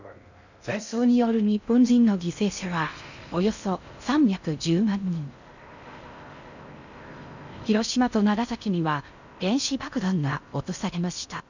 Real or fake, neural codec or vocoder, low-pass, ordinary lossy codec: fake; codec, 16 kHz in and 24 kHz out, 0.6 kbps, FocalCodec, streaming, 2048 codes; 7.2 kHz; none